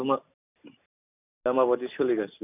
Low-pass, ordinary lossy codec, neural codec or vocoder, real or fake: 3.6 kHz; none; none; real